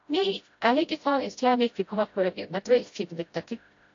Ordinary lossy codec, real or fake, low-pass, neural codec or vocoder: AAC, 48 kbps; fake; 7.2 kHz; codec, 16 kHz, 0.5 kbps, FreqCodec, smaller model